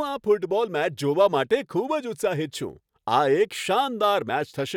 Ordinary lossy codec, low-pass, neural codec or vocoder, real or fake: none; 19.8 kHz; none; real